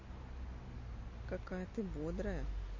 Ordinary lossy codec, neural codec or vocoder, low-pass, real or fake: MP3, 32 kbps; none; 7.2 kHz; real